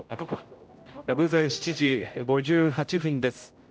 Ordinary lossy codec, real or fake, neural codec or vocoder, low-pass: none; fake; codec, 16 kHz, 0.5 kbps, X-Codec, HuBERT features, trained on general audio; none